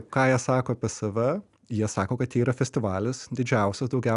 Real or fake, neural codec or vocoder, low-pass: real; none; 10.8 kHz